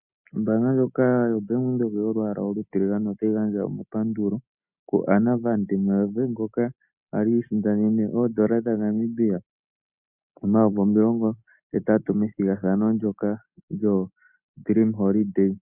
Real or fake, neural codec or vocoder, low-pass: real; none; 3.6 kHz